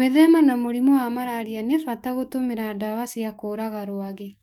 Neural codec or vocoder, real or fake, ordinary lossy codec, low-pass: codec, 44.1 kHz, 7.8 kbps, DAC; fake; none; 19.8 kHz